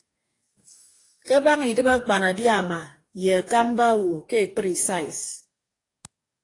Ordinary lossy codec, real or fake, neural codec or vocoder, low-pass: AAC, 48 kbps; fake; codec, 44.1 kHz, 2.6 kbps, DAC; 10.8 kHz